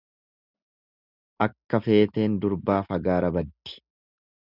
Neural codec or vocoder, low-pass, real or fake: none; 5.4 kHz; real